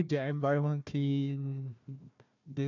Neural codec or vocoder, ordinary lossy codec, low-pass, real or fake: codec, 16 kHz, 1 kbps, FunCodec, trained on Chinese and English, 50 frames a second; none; 7.2 kHz; fake